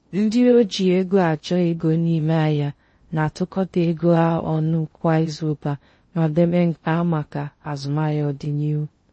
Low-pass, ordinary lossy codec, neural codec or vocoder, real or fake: 9.9 kHz; MP3, 32 kbps; codec, 16 kHz in and 24 kHz out, 0.6 kbps, FocalCodec, streaming, 4096 codes; fake